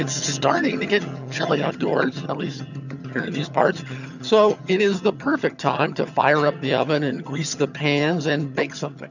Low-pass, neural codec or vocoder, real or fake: 7.2 kHz; vocoder, 22.05 kHz, 80 mel bands, HiFi-GAN; fake